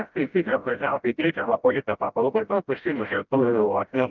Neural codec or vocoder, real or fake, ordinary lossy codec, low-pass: codec, 16 kHz, 0.5 kbps, FreqCodec, smaller model; fake; Opus, 32 kbps; 7.2 kHz